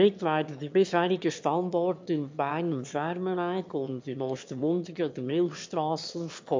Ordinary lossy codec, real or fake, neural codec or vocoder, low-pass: MP3, 48 kbps; fake; autoencoder, 22.05 kHz, a latent of 192 numbers a frame, VITS, trained on one speaker; 7.2 kHz